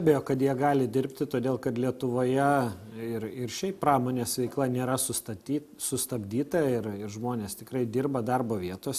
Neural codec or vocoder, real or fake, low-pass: none; real; 14.4 kHz